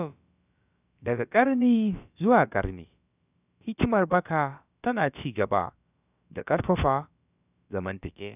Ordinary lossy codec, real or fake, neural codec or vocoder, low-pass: none; fake; codec, 16 kHz, about 1 kbps, DyCAST, with the encoder's durations; 3.6 kHz